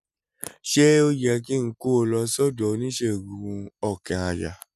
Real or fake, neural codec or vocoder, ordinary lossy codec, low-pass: real; none; none; 14.4 kHz